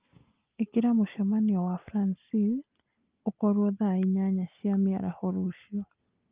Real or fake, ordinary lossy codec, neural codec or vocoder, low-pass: real; Opus, 32 kbps; none; 3.6 kHz